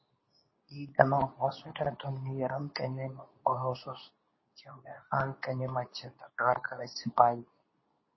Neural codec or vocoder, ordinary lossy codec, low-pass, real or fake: codec, 24 kHz, 0.9 kbps, WavTokenizer, medium speech release version 2; MP3, 24 kbps; 7.2 kHz; fake